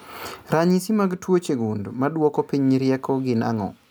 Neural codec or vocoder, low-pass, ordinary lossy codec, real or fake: none; none; none; real